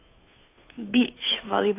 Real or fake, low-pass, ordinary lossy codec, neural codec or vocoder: fake; 3.6 kHz; AAC, 24 kbps; codec, 16 kHz, 2 kbps, FunCodec, trained on Chinese and English, 25 frames a second